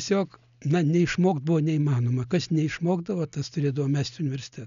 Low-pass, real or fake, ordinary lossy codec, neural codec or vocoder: 7.2 kHz; real; MP3, 96 kbps; none